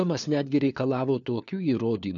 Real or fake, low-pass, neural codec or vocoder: fake; 7.2 kHz; codec, 16 kHz, 4 kbps, FunCodec, trained on LibriTTS, 50 frames a second